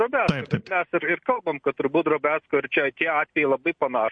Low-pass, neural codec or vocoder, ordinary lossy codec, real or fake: 9.9 kHz; none; MP3, 48 kbps; real